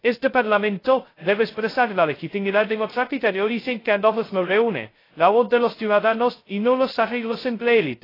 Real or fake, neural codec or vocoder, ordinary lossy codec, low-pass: fake; codec, 16 kHz, 0.2 kbps, FocalCodec; AAC, 24 kbps; 5.4 kHz